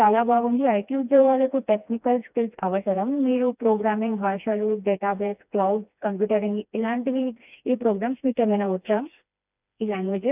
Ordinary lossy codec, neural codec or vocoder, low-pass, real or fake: none; codec, 16 kHz, 2 kbps, FreqCodec, smaller model; 3.6 kHz; fake